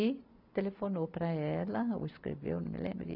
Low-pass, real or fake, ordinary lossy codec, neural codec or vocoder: 5.4 kHz; real; none; none